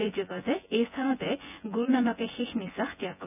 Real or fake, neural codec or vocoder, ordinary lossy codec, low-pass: fake; vocoder, 24 kHz, 100 mel bands, Vocos; none; 3.6 kHz